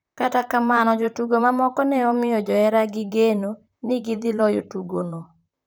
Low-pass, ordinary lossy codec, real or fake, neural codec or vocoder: none; none; fake; vocoder, 44.1 kHz, 128 mel bands, Pupu-Vocoder